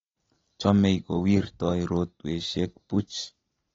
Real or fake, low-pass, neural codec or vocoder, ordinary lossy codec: real; 7.2 kHz; none; AAC, 24 kbps